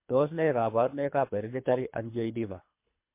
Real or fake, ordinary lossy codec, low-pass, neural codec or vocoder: fake; MP3, 24 kbps; 3.6 kHz; codec, 24 kHz, 3 kbps, HILCodec